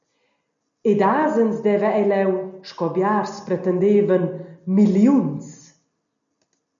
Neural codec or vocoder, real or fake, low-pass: none; real; 7.2 kHz